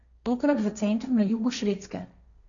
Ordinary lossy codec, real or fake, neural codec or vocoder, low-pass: none; fake; codec, 16 kHz, 1.1 kbps, Voila-Tokenizer; 7.2 kHz